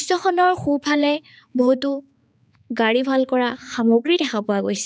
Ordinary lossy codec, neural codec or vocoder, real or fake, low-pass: none; codec, 16 kHz, 4 kbps, X-Codec, HuBERT features, trained on balanced general audio; fake; none